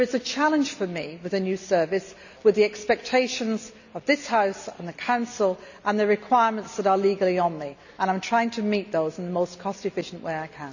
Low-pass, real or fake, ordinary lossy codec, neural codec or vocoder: 7.2 kHz; real; none; none